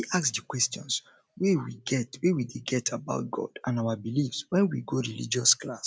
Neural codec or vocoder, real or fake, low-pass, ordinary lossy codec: none; real; none; none